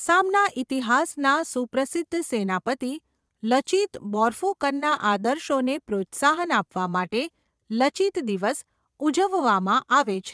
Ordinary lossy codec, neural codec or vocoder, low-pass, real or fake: none; vocoder, 22.05 kHz, 80 mel bands, Vocos; none; fake